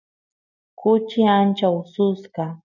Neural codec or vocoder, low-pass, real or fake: none; 7.2 kHz; real